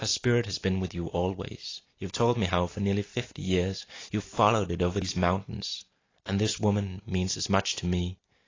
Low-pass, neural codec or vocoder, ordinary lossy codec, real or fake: 7.2 kHz; none; AAC, 32 kbps; real